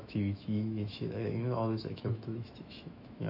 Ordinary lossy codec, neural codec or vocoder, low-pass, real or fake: none; autoencoder, 48 kHz, 128 numbers a frame, DAC-VAE, trained on Japanese speech; 5.4 kHz; fake